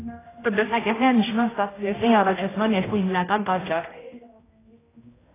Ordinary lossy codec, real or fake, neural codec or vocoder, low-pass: AAC, 16 kbps; fake; codec, 16 kHz, 0.5 kbps, X-Codec, HuBERT features, trained on general audio; 3.6 kHz